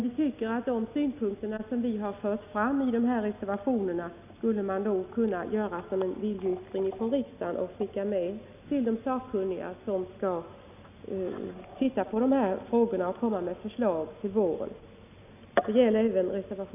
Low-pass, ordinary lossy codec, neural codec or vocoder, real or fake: 3.6 kHz; none; none; real